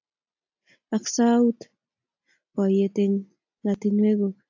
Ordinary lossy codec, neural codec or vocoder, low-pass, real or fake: AAC, 48 kbps; none; 7.2 kHz; real